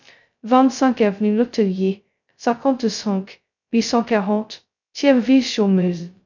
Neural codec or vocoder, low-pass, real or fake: codec, 16 kHz, 0.2 kbps, FocalCodec; 7.2 kHz; fake